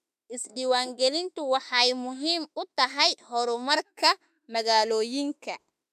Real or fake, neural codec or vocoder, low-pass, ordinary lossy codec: fake; autoencoder, 48 kHz, 32 numbers a frame, DAC-VAE, trained on Japanese speech; 14.4 kHz; none